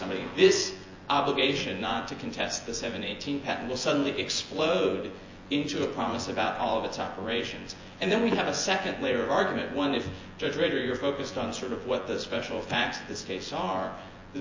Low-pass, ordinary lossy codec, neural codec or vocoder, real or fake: 7.2 kHz; MP3, 32 kbps; vocoder, 24 kHz, 100 mel bands, Vocos; fake